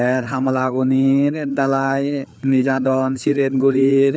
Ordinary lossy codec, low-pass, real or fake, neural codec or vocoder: none; none; fake; codec, 16 kHz, 4 kbps, FreqCodec, larger model